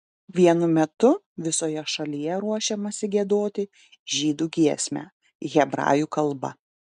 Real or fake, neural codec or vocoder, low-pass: real; none; 9.9 kHz